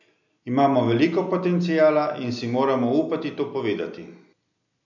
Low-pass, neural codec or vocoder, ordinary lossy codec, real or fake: 7.2 kHz; none; none; real